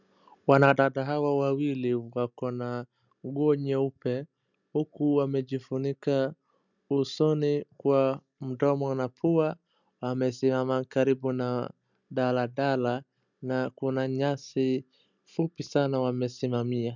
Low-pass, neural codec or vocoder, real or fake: 7.2 kHz; none; real